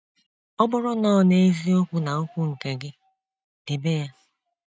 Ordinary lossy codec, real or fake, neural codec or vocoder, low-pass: none; real; none; none